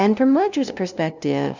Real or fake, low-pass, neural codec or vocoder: fake; 7.2 kHz; codec, 16 kHz, 0.5 kbps, FunCodec, trained on LibriTTS, 25 frames a second